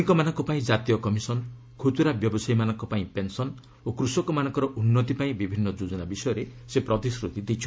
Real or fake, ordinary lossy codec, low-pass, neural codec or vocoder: real; none; none; none